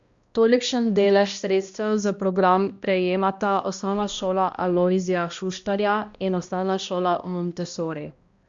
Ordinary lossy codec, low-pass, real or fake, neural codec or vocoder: Opus, 64 kbps; 7.2 kHz; fake; codec, 16 kHz, 1 kbps, X-Codec, HuBERT features, trained on balanced general audio